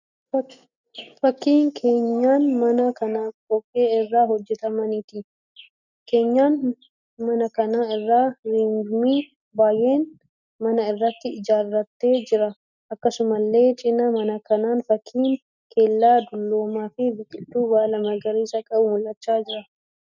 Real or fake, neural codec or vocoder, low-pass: real; none; 7.2 kHz